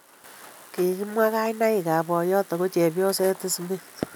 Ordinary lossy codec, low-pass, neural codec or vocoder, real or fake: none; none; none; real